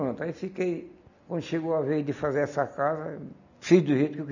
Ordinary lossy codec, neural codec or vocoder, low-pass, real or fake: none; none; 7.2 kHz; real